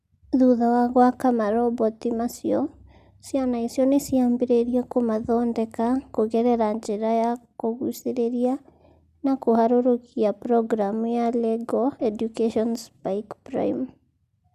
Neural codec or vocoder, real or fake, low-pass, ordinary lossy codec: none; real; 14.4 kHz; Opus, 64 kbps